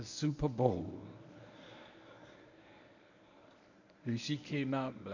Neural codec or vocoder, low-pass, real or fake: codec, 24 kHz, 0.9 kbps, WavTokenizer, medium speech release version 1; 7.2 kHz; fake